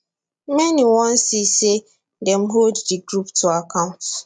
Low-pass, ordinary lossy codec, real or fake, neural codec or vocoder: 9.9 kHz; none; real; none